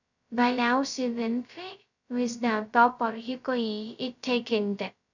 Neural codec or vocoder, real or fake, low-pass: codec, 16 kHz, 0.2 kbps, FocalCodec; fake; 7.2 kHz